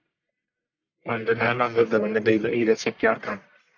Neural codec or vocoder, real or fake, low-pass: codec, 44.1 kHz, 1.7 kbps, Pupu-Codec; fake; 7.2 kHz